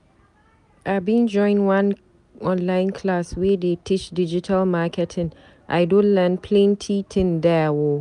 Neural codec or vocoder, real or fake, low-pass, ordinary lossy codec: none; real; 10.8 kHz; none